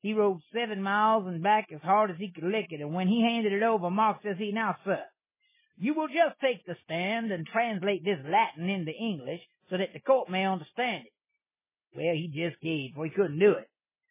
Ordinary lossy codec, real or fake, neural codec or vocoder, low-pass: MP3, 16 kbps; real; none; 3.6 kHz